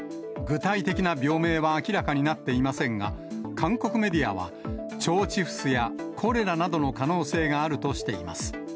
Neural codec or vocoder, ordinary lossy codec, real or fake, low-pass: none; none; real; none